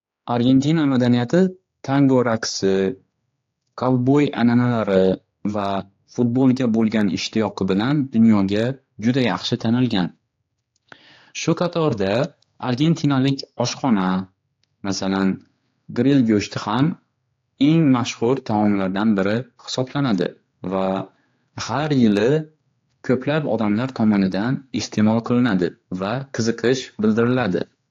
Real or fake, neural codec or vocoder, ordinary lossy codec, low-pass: fake; codec, 16 kHz, 4 kbps, X-Codec, HuBERT features, trained on general audio; AAC, 48 kbps; 7.2 kHz